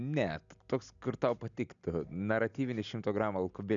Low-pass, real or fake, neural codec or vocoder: 7.2 kHz; real; none